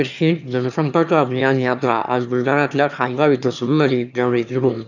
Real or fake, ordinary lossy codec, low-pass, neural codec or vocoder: fake; none; 7.2 kHz; autoencoder, 22.05 kHz, a latent of 192 numbers a frame, VITS, trained on one speaker